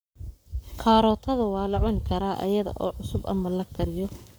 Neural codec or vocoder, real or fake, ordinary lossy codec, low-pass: codec, 44.1 kHz, 7.8 kbps, Pupu-Codec; fake; none; none